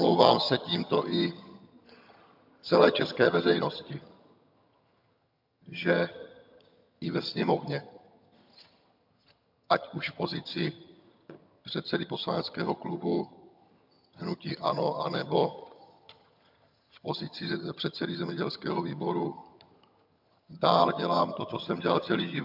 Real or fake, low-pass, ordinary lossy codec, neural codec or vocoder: fake; 5.4 kHz; MP3, 48 kbps; vocoder, 22.05 kHz, 80 mel bands, HiFi-GAN